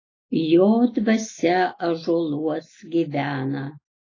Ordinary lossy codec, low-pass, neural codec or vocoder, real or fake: AAC, 32 kbps; 7.2 kHz; none; real